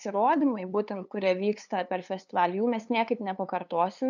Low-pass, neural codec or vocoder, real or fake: 7.2 kHz; codec, 16 kHz, 2 kbps, FunCodec, trained on LibriTTS, 25 frames a second; fake